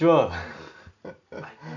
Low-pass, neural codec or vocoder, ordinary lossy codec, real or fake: 7.2 kHz; none; none; real